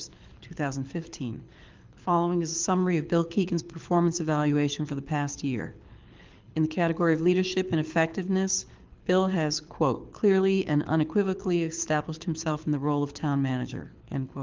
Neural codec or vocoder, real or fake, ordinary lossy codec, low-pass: codec, 24 kHz, 6 kbps, HILCodec; fake; Opus, 24 kbps; 7.2 kHz